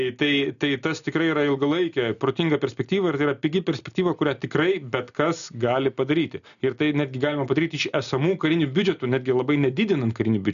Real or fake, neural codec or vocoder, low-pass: real; none; 7.2 kHz